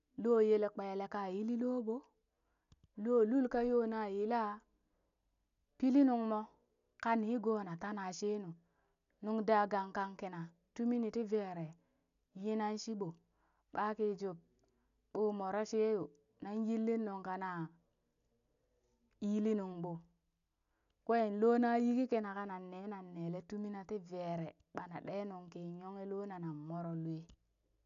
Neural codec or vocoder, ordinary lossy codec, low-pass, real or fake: none; none; 7.2 kHz; real